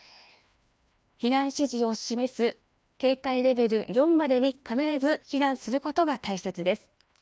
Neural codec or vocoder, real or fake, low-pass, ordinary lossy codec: codec, 16 kHz, 1 kbps, FreqCodec, larger model; fake; none; none